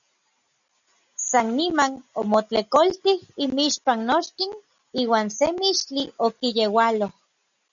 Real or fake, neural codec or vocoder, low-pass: real; none; 7.2 kHz